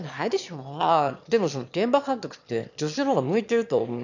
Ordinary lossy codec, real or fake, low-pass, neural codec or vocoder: none; fake; 7.2 kHz; autoencoder, 22.05 kHz, a latent of 192 numbers a frame, VITS, trained on one speaker